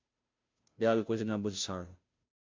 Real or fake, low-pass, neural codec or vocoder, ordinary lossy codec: fake; 7.2 kHz; codec, 16 kHz, 0.5 kbps, FunCodec, trained on Chinese and English, 25 frames a second; MP3, 64 kbps